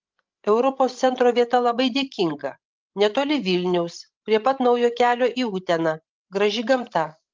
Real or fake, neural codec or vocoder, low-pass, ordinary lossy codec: fake; codec, 16 kHz, 16 kbps, FreqCodec, larger model; 7.2 kHz; Opus, 24 kbps